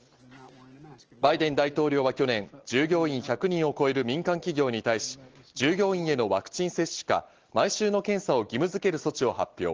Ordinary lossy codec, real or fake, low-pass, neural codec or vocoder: Opus, 16 kbps; real; 7.2 kHz; none